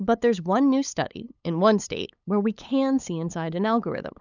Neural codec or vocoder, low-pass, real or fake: codec, 16 kHz, 8 kbps, FunCodec, trained on LibriTTS, 25 frames a second; 7.2 kHz; fake